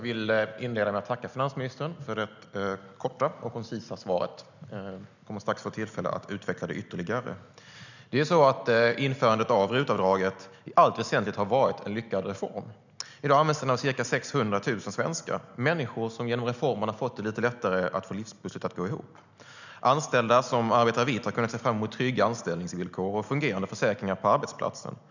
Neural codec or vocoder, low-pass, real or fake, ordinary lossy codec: none; 7.2 kHz; real; none